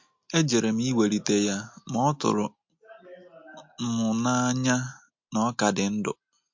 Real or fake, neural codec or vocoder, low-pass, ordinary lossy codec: real; none; 7.2 kHz; MP3, 48 kbps